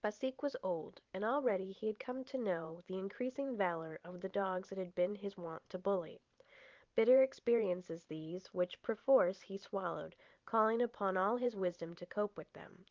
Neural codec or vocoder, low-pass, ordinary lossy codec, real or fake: vocoder, 44.1 kHz, 128 mel bands every 512 samples, BigVGAN v2; 7.2 kHz; Opus, 32 kbps; fake